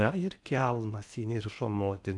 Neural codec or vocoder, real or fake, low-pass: codec, 16 kHz in and 24 kHz out, 0.8 kbps, FocalCodec, streaming, 65536 codes; fake; 10.8 kHz